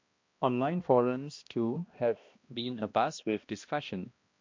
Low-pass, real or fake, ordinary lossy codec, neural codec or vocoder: 7.2 kHz; fake; MP3, 48 kbps; codec, 16 kHz, 1 kbps, X-Codec, HuBERT features, trained on balanced general audio